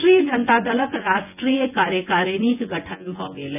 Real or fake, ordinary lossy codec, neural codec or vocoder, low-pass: fake; none; vocoder, 24 kHz, 100 mel bands, Vocos; 3.6 kHz